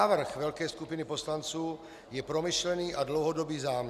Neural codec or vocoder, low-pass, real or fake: none; 14.4 kHz; real